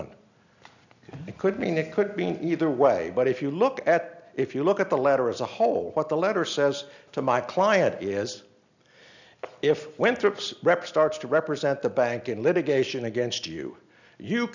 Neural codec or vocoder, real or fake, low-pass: none; real; 7.2 kHz